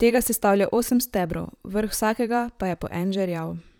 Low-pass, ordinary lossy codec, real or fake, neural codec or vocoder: none; none; fake; vocoder, 44.1 kHz, 128 mel bands, Pupu-Vocoder